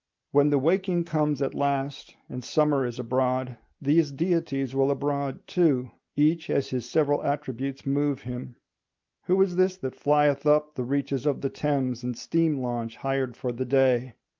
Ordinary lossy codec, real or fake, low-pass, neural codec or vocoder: Opus, 32 kbps; real; 7.2 kHz; none